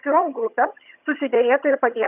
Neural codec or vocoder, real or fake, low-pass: vocoder, 22.05 kHz, 80 mel bands, HiFi-GAN; fake; 3.6 kHz